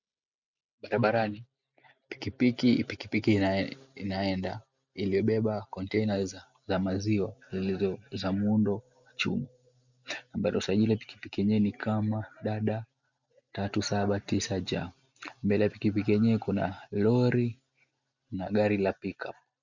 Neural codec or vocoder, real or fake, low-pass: none; real; 7.2 kHz